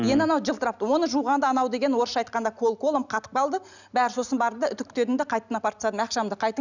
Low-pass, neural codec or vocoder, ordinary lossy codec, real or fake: 7.2 kHz; none; none; real